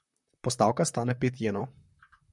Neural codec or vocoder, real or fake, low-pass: vocoder, 44.1 kHz, 128 mel bands, Pupu-Vocoder; fake; 10.8 kHz